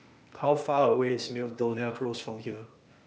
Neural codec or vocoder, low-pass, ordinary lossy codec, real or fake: codec, 16 kHz, 0.8 kbps, ZipCodec; none; none; fake